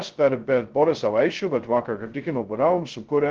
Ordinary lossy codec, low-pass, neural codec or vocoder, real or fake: Opus, 16 kbps; 7.2 kHz; codec, 16 kHz, 0.2 kbps, FocalCodec; fake